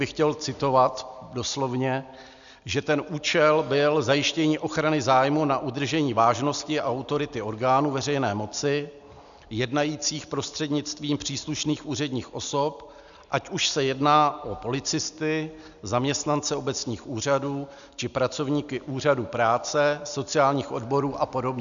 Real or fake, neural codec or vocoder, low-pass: real; none; 7.2 kHz